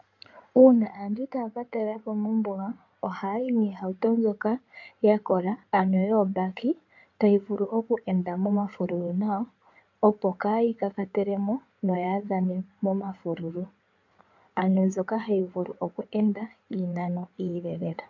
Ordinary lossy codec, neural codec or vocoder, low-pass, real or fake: AAC, 48 kbps; codec, 16 kHz in and 24 kHz out, 2.2 kbps, FireRedTTS-2 codec; 7.2 kHz; fake